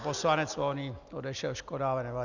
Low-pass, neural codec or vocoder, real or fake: 7.2 kHz; none; real